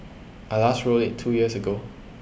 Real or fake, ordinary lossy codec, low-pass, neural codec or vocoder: real; none; none; none